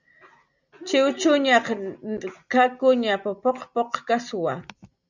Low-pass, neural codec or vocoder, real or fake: 7.2 kHz; none; real